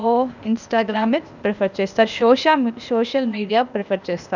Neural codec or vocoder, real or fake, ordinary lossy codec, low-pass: codec, 16 kHz, 0.8 kbps, ZipCodec; fake; none; 7.2 kHz